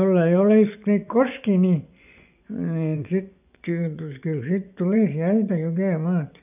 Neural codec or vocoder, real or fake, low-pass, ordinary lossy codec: vocoder, 24 kHz, 100 mel bands, Vocos; fake; 3.6 kHz; none